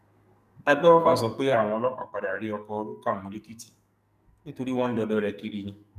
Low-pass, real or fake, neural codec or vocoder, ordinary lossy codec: 14.4 kHz; fake; codec, 44.1 kHz, 2.6 kbps, SNAC; none